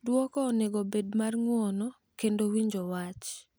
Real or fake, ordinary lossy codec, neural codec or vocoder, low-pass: real; none; none; none